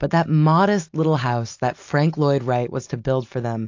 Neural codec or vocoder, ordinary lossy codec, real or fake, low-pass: none; AAC, 48 kbps; real; 7.2 kHz